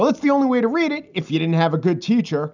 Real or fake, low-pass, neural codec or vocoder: real; 7.2 kHz; none